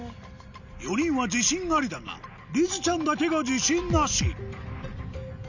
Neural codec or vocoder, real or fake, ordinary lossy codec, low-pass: none; real; none; 7.2 kHz